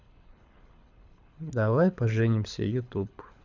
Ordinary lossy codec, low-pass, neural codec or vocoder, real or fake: none; 7.2 kHz; codec, 24 kHz, 6 kbps, HILCodec; fake